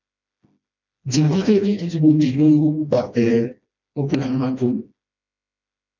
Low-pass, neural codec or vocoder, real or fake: 7.2 kHz; codec, 16 kHz, 1 kbps, FreqCodec, smaller model; fake